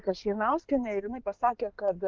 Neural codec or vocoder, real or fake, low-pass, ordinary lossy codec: codec, 16 kHz in and 24 kHz out, 2.2 kbps, FireRedTTS-2 codec; fake; 7.2 kHz; Opus, 32 kbps